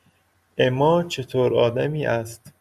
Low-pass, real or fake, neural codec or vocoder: 14.4 kHz; real; none